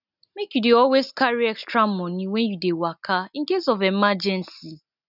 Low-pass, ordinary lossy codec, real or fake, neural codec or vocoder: 5.4 kHz; none; real; none